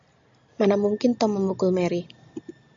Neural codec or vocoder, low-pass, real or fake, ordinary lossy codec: none; 7.2 kHz; real; MP3, 48 kbps